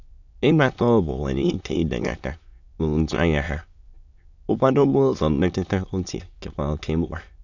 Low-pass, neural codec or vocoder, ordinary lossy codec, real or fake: 7.2 kHz; autoencoder, 22.05 kHz, a latent of 192 numbers a frame, VITS, trained on many speakers; none; fake